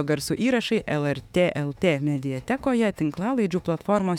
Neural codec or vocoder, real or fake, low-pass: autoencoder, 48 kHz, 32 numbers a frame, DAC-VAE, trained on Japanese speech; fake; 19.8 kHz